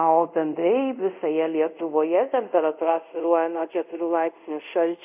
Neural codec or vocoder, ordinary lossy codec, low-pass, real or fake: codec, 24 kHz, 0.5 kbps, DualCodec; AAC, 32 kbps; 3.6 kHz; fake